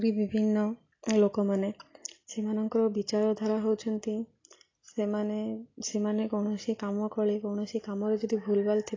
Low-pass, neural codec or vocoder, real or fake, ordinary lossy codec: 7.2 kHz; none; real; AAC, 32 kbps